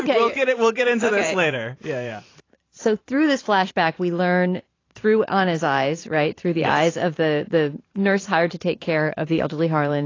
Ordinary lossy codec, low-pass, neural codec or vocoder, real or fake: AAC, 32 kbps; 7.2 kHz; vocoder, 44.1 kHz, 128 mel bands every 512 samples, BigVGAN v2; fake